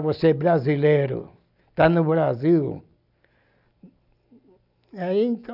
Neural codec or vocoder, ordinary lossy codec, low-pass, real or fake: none; none; 5.4 kHz; real